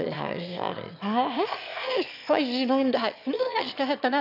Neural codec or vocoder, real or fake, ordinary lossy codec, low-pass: autoencoder, 22.05 kHz, a latent of 192 numbers a frame, VITS, trained on one speaker; fake; none; 5.4 kHz